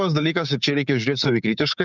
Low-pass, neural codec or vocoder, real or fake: 7.2 kHz; codec, 16 kHz, 6 kbps, DAC; fake